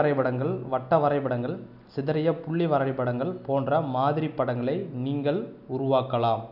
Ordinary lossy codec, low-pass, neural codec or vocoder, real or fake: none; 5.4 kHz; none; real